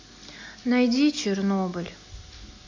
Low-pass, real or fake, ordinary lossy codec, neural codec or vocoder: 7.2 kHz; real; AAC, 48 kbps; none